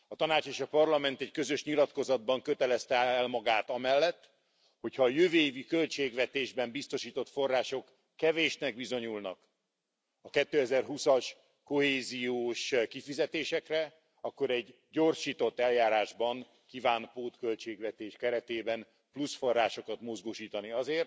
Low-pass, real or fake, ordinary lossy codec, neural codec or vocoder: none; real; none; none